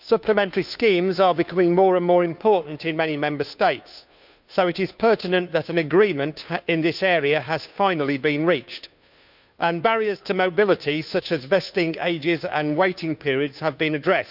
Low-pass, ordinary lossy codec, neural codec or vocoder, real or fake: 5.4 kHz; none; codec, 16 kHz, 2 kbps, FunCodec, trained on Chinese and English, 25 frames a second; fake